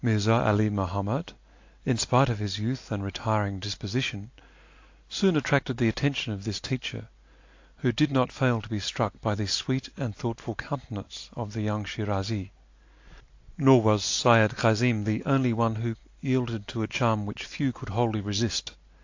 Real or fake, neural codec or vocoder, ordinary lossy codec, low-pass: real; none; AAC, 48 kbps; 7.2 kHz